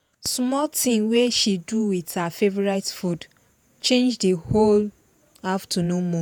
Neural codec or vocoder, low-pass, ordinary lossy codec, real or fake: vocoder, 48 kHz, 128 mel bands, Vocos; none; none; fake